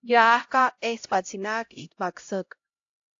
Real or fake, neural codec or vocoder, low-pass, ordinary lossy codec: fake; codec, 16 kHz, 0.5 kbps, X-Codec, HuBERT features, trained on LibriSpeech; 7.2 kHz; AAC, 48 kbps